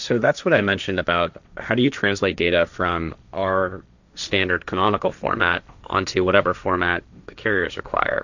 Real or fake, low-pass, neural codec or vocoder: fake; 7.2 kHz; codec, 16 kHz, 1.1 kbps, Voila-Tokenizer